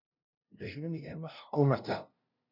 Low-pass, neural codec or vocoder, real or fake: 5.4 kHz; codec, 16 kHz, 0.5 kbps, FunCodec, trained on LibriTTS, 25 frames a second; fake